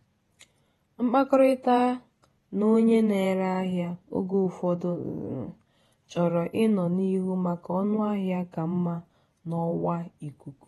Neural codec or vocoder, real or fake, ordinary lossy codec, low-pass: vocoder, 48 kHz, 128 mel bands, Vocos; fake; AAC, 32 kbps; 19.8 kHz